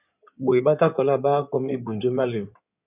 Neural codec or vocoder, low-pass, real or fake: codec, 16 kHz in and 24 kHz out, 2.2 kbps, FireRedTTS-2 codec; 3.6 kHz; fake